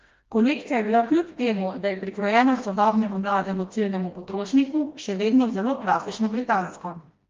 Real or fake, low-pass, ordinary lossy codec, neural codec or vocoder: fake; 7.2 kHz; Opus, 32 kbps; codec, 16 kHz, 1 kbps, FreqCodec, smaller model